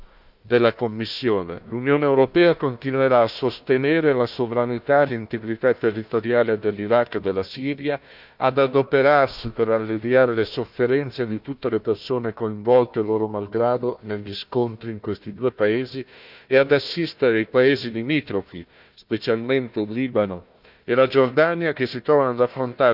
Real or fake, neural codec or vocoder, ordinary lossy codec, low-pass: fake; codec, 16 kHz, 1 kbps, FunCodec, trained on Chinese and English, 50 frames a second; none; 5.4 kHz